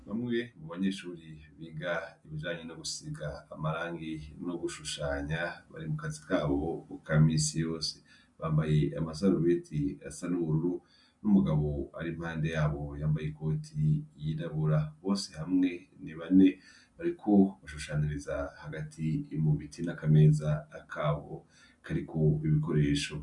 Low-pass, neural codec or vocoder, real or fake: 10.8 kHz; none; real